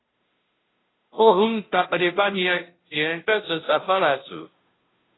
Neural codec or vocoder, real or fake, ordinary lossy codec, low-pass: codec, 16 kHz, 0.5 kbps, FunCodec, trained on Chinese and English, 25 frames a second; fake; AAC, 16 kbps; 7.2 kHz